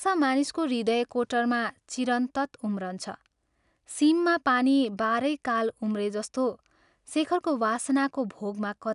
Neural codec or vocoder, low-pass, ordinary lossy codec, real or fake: none; 10.8 kHz; none; real